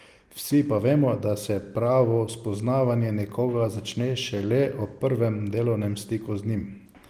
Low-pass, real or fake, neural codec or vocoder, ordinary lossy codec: 14.4 kHz; real; none; Opus, 24 kbps